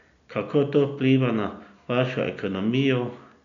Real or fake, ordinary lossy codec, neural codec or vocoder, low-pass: real; none; none; 7.2 kHz